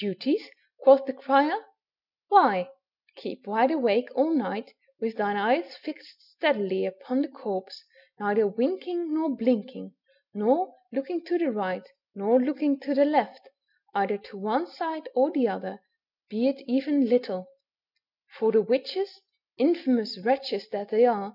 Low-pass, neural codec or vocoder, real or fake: 5.4 kHz; none; real